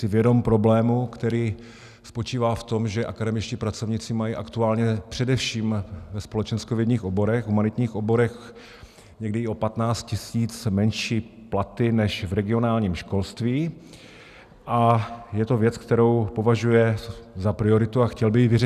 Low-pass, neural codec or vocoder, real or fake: 14.4 kHz; none; real